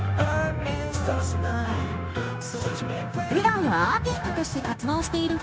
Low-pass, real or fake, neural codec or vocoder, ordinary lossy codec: none; fake; codec, 16 kHz, 0.9 kbps, LongCat-Audio-Codec; none